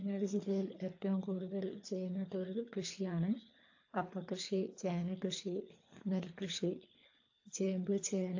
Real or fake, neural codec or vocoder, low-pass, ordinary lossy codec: fake; codec, 24 kHz, 3 kbps, HILCodec; 7.2 kHz; AAC, 48 kbps